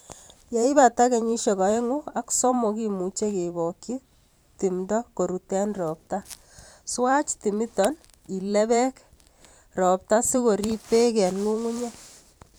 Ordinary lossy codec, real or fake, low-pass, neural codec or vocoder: none; fake; none; vocoder, 44.1 kHz, 128 mel bands every 512 samples, BigVGAN v2